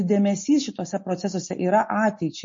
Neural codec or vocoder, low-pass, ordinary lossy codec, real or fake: none; 7.2 kHz; MP3, 32 kbps; real